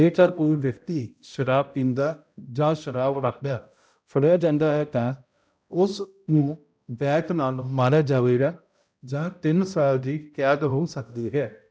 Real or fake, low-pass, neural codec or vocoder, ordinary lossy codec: fake; none; codec, 16 kHz, 0.5 kbps, X-Codec, HuBERT features, trained on balanced general audio; none